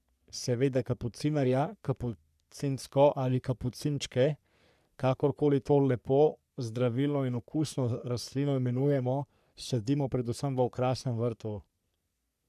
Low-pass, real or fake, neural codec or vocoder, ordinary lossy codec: 14.4 kHz; fake; codec, 44.1 kHz, 3.4 kbps, Pupu-Codec; none